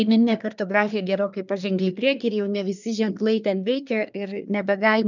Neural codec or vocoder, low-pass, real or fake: codec, 24 kHz, 1 kbps, SNAC; 7.2 kHz; fake